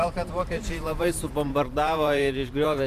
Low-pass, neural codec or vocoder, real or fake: 14.4 kHz; vocoder, 44.1 kHz, 128 mel bands every 512 samples, BigVGAN v2; fake